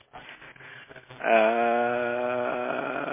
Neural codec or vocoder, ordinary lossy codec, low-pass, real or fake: none; MP3, 16 kbps; 3.6 kHz; real